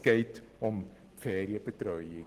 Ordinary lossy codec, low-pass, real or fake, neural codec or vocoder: Opus, 16 kbps; 14.4 kHz; real; none